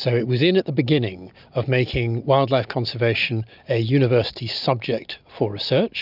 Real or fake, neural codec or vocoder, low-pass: real; none; 5.4 kHz